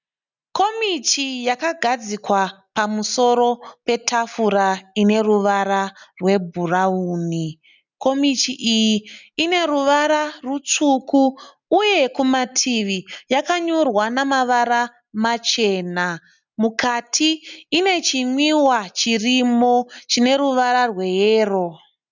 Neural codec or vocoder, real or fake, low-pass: none; real; 7.2 kHz